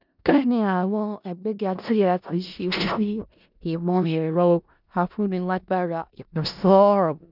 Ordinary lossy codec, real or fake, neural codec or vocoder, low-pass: none; fake; codec, 16 kHz in and 24 kHz out, 0.4 kbps, LongCat-Audio-Codec, four codebook decoder; 5.4 kHz